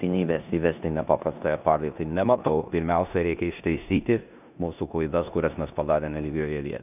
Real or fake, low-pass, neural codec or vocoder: fake; 3.6 kHz; codec, 16 kHz in and 24 kHz out, 0.9 kbps, LongCat-Audio-Codec, four codebook decoder